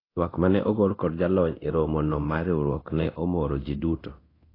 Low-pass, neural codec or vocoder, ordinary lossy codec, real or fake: 5.4 kHz; codec, 24 kHz, 0.9 kbps, DualCodec; AAC, 24 kbps; fake